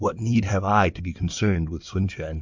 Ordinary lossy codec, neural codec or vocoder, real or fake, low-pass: MP3, 64 kbps; codec, 16 kHz in and 24 kHz out, 2.2 kbps, FireRedTTS-2 codec; fake; 7.2 kHz